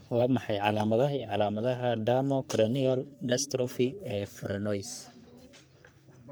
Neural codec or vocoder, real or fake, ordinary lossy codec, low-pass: codec, 44.1 kHz, 3.4 kbps, Pupu-Codec; fake; none; none